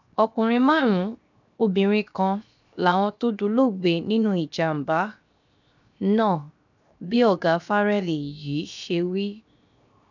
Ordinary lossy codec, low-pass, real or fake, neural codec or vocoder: none; 7.2 kHz; fake; codec, 16 kHz, 0.7 kbps, FocalCodec